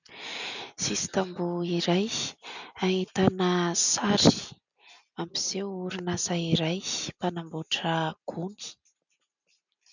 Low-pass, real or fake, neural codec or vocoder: 7.2 kHz; real; none